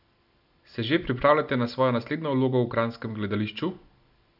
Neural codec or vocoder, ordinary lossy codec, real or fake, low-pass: none; none; real; 5.4 kHz